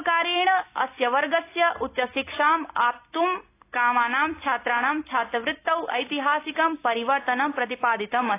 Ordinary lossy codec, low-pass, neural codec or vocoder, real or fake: AAC, 24 kbps; 3.6 kHz; none; real